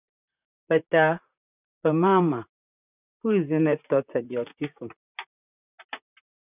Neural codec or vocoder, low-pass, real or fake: vocoder, 44.1 kHz, 128 mel bands, Pupu-Vocoder; 3.6 kHz; fake